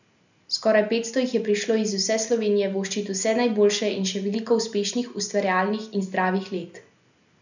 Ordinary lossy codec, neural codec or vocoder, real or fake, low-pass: none; none; real; 7.2 kHz